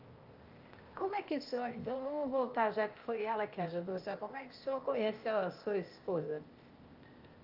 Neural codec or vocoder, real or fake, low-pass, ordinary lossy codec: codec, 16 kHz, 0.8 kbps, ZipCodec; fake; 5.4 kHz; Opus, 24 kbps